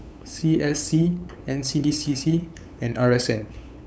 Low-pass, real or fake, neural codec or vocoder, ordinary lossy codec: none; fake; codec, 16 kHz, 8 kbps, FunCodec, trained on LibriTTS, 25 frames a second; none